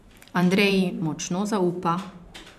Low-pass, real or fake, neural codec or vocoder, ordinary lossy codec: 14.4 kHz; fake; vocoder, 44.1 kHz, 128 mel bands every 512 samples, BigVGAN v2; none